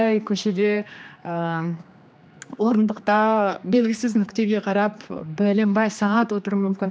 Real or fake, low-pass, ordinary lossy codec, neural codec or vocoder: fake; none; none; codec, 16 kHz, 2 kbps, X-Codec, HuBERT features, trained on general audio